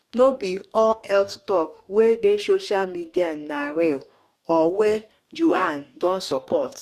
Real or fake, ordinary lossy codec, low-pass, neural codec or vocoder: fake; Opus, 64 kbps; 14.4 kHz; codec, 44.1 kHz, 2.6 kbps, DAC